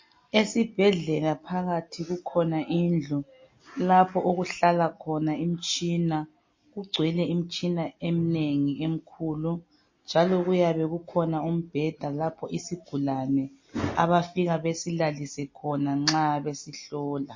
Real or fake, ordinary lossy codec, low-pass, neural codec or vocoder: real; MP3, 32 kbps; 7.2 kHz; none